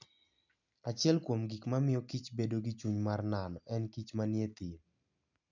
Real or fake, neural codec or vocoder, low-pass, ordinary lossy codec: real; none; 7.2 kHz; none